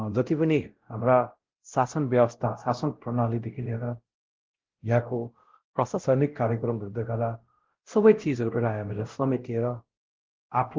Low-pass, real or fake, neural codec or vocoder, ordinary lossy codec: 7.2 kHz; fake; codec, 16 kHz, 0.5 kbps, X-Codec, WavLM features, trained on Multilingual LibriSpeech; Opus, 16 kbps